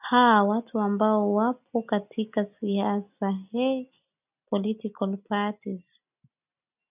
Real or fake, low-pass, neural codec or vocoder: real; 3.6 kHz; none